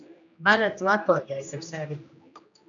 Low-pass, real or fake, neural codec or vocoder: 7.2 kHz; fake; codec, 16 kHz, 1 kbps, X-Codec, HuBERT features, trained on general audio